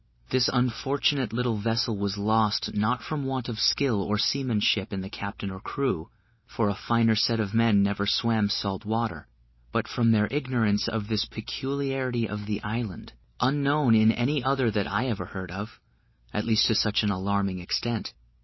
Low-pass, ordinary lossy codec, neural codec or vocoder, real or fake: 7.2 kHz; MP3, 24 kbps; none; real